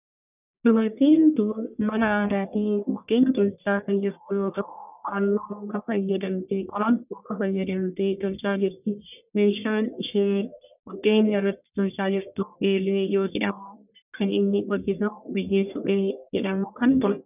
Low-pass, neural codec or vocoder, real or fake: 3.6 kHz; codec, 44.1 kHz, 1.7 kbps, Pupu-Codec; fake